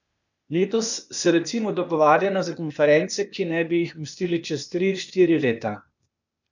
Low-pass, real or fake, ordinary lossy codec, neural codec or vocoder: 7.2 kHz; fake; none; codec, 16 kHz, 0.8 kbps, ZipCodec